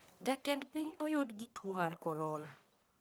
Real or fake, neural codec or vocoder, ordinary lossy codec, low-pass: fake; codec, 44.1 kHz, 1.7 kbps, Pupu-Codec; none; none